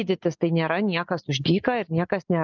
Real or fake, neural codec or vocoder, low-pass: real; none; 7.2 kHz